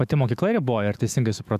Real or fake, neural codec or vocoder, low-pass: fake; autoencoder, 48 kHz, 128 numbers a frame, DAC-VAE, trained on Japanese speech; 14.4 kHz